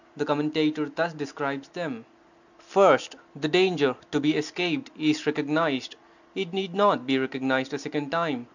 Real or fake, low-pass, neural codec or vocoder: real; 7.2 kHz; none